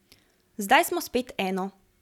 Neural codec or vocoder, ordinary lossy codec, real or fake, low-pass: none; none; real; 19.8 kHz